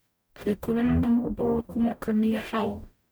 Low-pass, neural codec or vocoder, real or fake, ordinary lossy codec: none; codec, 44.1 kHz, 0.9 kbps, DAC; fake; none